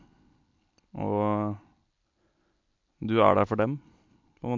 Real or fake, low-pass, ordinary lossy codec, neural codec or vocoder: real; 7.2 kHz; MP3, 48 kbps; none